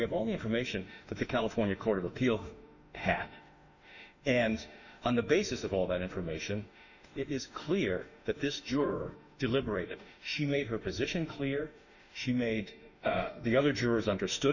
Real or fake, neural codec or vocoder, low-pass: fake; autoencoder, 48 kHz, 32 numbers a frame, DAC-VAE, trained on Japanese speech; 7.2 kHz